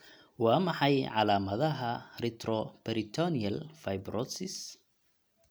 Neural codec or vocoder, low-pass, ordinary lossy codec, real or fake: none; none; none; real